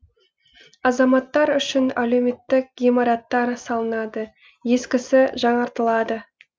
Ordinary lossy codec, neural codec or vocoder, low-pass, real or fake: none; none; none; real